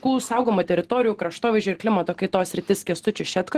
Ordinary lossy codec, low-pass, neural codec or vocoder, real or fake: Opus, 16 kbps; 14.4 kHz; none; real